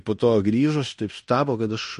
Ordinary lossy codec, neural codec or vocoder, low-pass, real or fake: MP3, 48 kbps; codec, 16 kHz in and 24 kHz out, 0.9 kbps, LongCat-Audio-Codec, fine tuned four codebook decoder; 10.8 kHz; fake